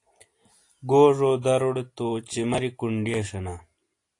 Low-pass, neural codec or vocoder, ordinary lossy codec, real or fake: 10.8 kHz; none; AAC, 48 kbps; real